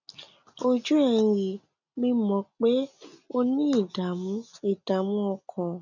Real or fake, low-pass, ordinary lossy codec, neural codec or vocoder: real; 7.2 kHz; none; none